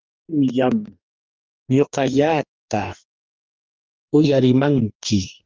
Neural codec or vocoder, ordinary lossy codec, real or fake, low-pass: codec, 44.1 kHz, 2.6 kbps, DAC; Opus, 32 kbps; fake; 7.2 kHz